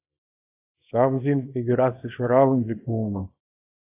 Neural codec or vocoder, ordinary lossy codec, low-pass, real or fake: codec, 24 kHz, 0.9 kbps, WavTokenizer, small release; AAC, 24 kbps; 3.6 kHz; fake